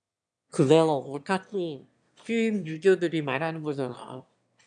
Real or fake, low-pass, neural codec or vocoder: fake; 9.9 kHz; autoencoder, 22.05 kHz, a latent of 192 numbers a frame, VITS, trained on one speaker